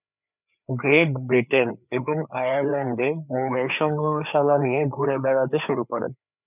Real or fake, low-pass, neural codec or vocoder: fake; 3.6 kHz; codec, 16 kHz, 4 kbps, FreqCodec, larger model